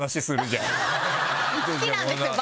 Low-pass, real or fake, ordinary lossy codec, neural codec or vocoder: none; real; none; none